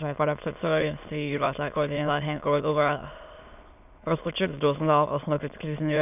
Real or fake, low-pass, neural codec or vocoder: fake; 3.6 kHz; autoencoder, 22.05 kHz, a latent of 192 numbers a frame, VITS, trained on many speakers